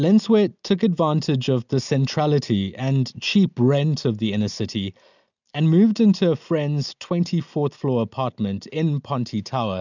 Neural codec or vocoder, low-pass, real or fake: none; 7.2 kHz; real